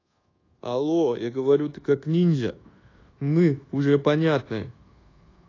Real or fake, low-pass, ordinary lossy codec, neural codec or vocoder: fake; 7.2 kHz; AAC, 32 kbps; codec, 24 kHz, 1.2 kbps, DualCodec